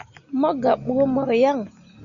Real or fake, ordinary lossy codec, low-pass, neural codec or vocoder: real; Opus, 64 kbps; 7.2 kHz; none